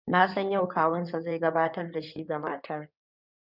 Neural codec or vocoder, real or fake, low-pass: codec, 16 kHz in and 24 kHz out, 2.2 kbps, FireRedTTS-2 codec; fake; 5.4 kHz